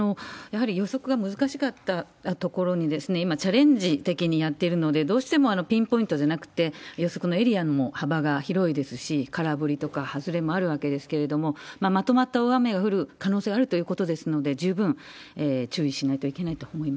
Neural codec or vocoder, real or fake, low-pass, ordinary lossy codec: none; real; none; none